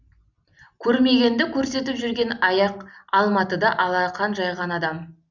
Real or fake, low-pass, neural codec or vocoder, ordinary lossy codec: real; 7.2 kHz; none; none